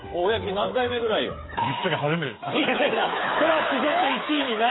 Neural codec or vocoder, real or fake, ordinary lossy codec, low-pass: codec, 16 kHz, 8 kbps, FreqCodec, smaller model; fake; AAC, 16 kbps; 7.2 kHz